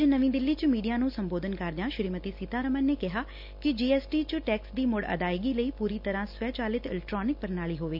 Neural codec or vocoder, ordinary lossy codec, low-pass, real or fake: none; none; 5.4 kHz; real